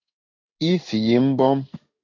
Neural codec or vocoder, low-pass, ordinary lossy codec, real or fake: codec, 16 kHz in and 24 kHz out, 1 kbps, XY-Tokenizer; 7.2 kHz; MP3, 48 kbps; fake